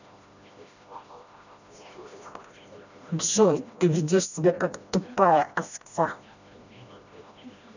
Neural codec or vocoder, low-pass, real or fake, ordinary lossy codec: codec, 16 kHz, 1 kbps, FreqCodec, smaller model; 7.2 kHz; fake; none